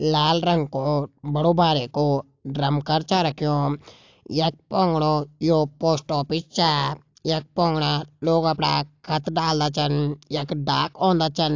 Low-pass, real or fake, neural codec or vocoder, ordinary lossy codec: 7.2 kHz; real; none; AAC, 48 kbps